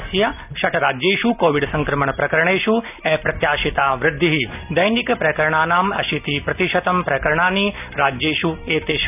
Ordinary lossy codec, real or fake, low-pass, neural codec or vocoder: none; real; 3.6 kHz; none